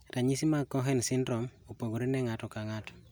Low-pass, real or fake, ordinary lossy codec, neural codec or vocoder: none; real; none; none